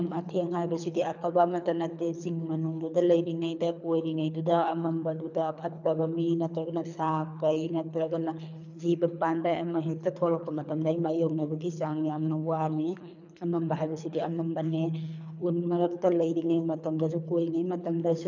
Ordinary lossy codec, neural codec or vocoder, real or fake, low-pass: none; codec, 24 kHz, 3 kbps, HILCodec; fake; 7.2 kHz